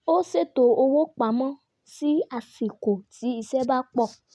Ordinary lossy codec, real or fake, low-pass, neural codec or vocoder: none; real; none; none